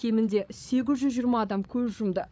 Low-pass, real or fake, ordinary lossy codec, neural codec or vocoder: none; fake; none; codec, 16 kHz, 16 kbps, FreqCodec, larger model